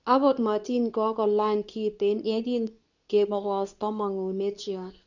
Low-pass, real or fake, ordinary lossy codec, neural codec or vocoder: 7.2 kHz; fake; none; codec, 24 kHz, 0.9 kbps, WavTokenizer, medium speech release version 2